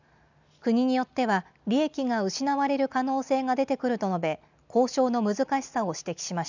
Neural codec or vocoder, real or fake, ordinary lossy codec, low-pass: none; real; none; 7.2 kHz